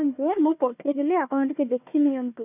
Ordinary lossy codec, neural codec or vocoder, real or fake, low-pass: none; codec, 16 kHz, 1 kbps, FunCodec, trained on Chinese and English, 50 frames a second; fake; 3.6 kHz